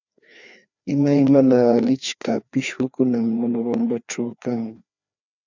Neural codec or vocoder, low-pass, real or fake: codec, 16 kHz, 2 kbps, FreqCodec, larger model; 7.2 kHz; fake